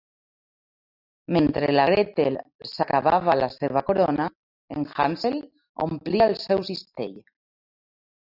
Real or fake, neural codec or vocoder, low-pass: real; none; 5.4 kHz